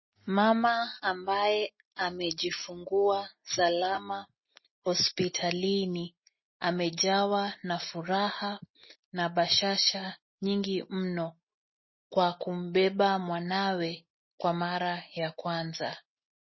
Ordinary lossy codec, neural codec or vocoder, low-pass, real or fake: MP3, 24 kbps; none; 7.2 kHz; real